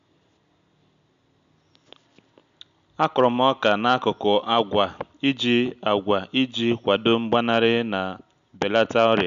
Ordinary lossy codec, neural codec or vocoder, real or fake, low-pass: none; none; real; 7.2 kHz